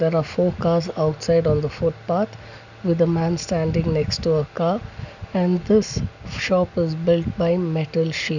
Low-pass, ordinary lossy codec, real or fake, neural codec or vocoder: 7.2 kHz; none; real; none